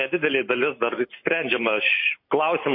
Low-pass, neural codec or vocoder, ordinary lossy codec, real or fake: 5.4 kHz; none; MP3, 24 kbps; real